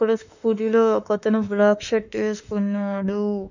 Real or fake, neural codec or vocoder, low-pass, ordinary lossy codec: fake; autoencoder, 48 kHz, 32 numbers a frame, DAC-VAE, trained on Japanese speech; 7.2 kHz; none